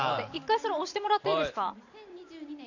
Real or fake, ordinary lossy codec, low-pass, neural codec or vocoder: fake; none; 7.2 kHz; vocoder, 44.1 kHz, 128 mel bands every 512 samples, BigVGAN v2